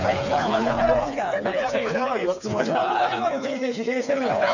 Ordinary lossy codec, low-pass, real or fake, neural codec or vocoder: none; 7.2 kHz; fake; codec, 16 kHz, 4 kbps, FreqCodec, smaller model